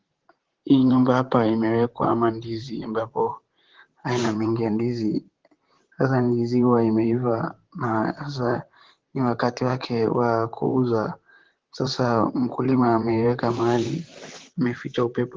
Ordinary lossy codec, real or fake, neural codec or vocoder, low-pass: Opus, 16 kbps; fake; vocoder, 44.1 kHz, 128 mel bands, Pupu-Vocoder; 7.2 kHz